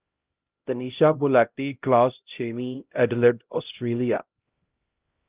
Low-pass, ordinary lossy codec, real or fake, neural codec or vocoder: 3.6 kHz; Opus, 32 kbps; fake; codec, 16 kHz, 0.5 kbps, X-Codec, HuBERT features, trained on LibriSpeech